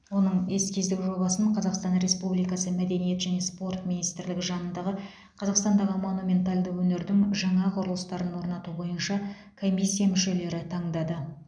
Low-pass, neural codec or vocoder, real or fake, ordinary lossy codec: 9.9 kHz; none; real; none